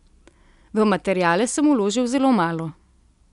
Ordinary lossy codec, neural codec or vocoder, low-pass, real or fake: none; none; 10.8 kHz; real